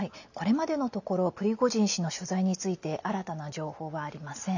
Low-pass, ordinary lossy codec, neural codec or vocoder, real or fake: 7.2 kHz; none; none; real